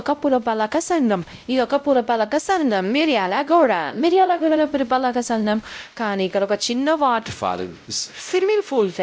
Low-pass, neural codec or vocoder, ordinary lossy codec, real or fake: none; codec, 16 kHz, 0.5 kbps, X-Codec, WavLM features, trained on Multilingual LibriSpeech; none; fake